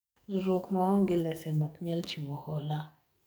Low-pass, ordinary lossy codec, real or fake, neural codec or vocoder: none; none; fake; codec, 44.1 kHz, 2.6 kbps, SNAC